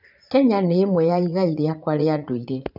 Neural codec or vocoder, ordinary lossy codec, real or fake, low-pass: vocoder, 44.1 kHz, 80 mel bands, Vocos; none; fake; 5.4 kHz